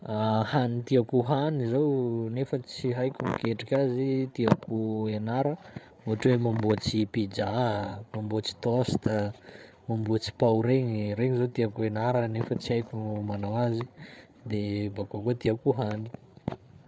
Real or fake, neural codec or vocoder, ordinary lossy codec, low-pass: fake; codec, 16 kHz, 8 kbps, FreqCodec, larger model; none; none